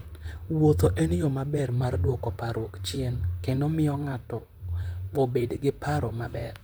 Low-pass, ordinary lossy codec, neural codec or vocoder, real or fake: none; none; vocoder, 44.1 kHz, 128 mel bands, Pupu-Vocoder; fake